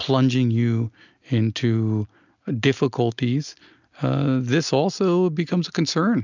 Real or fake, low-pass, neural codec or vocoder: real; 7.2 kHz; none